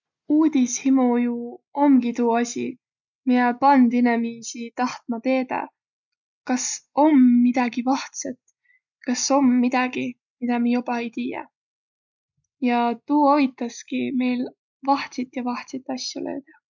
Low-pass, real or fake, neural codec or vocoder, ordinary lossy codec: 7.2 kHz; real; none; none